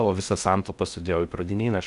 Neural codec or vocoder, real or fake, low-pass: codec, 16 kHz in and 24 kHz out, 0.6 kbps, FocalCodec, streaming, 4096 codes; fake; 10.8 kHz